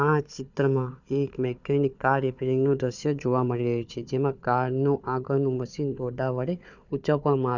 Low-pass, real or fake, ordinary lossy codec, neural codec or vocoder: 7.2 kHz; fake; none; codec, 16 kHz, 4 kbps, FunCodec, trained on Chinese and English, 50 frames a second